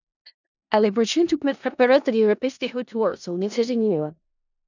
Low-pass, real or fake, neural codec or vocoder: 7.2 kHz; fake; codec, 16 kHz in and 24 kHz out, 0.4 kbps, LongCat-Audio-Codec, four codebook decoder